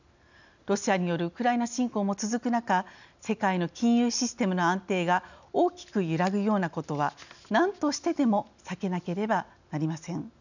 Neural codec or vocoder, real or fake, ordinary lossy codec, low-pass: none; real; none; 7.2 kHz